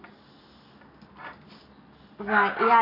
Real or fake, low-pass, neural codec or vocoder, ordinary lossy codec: real; 5.4 kHz; none; none